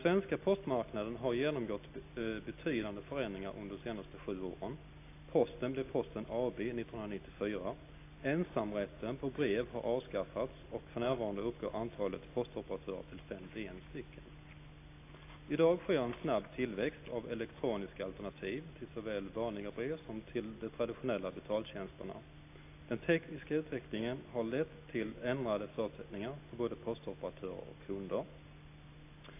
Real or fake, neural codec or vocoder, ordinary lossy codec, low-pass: real; none; AAC, 24 kbps; 3.6 kHz